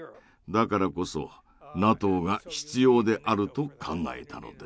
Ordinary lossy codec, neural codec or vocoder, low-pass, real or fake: none; none; none; real